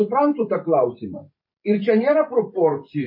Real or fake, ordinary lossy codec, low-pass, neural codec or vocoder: fake; MP3, 24 kbps; 5.4 kHz; vocoder, 44.1 kHz, 128 mel bands every 512 samples, BigVGAN v2